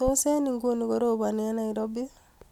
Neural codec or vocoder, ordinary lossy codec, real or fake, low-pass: none; none; real; 19.8 kHz